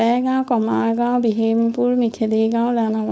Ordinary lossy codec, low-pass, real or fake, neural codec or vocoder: none; none; fake; codec, 16 kHz, 4.8 kbps, FACodec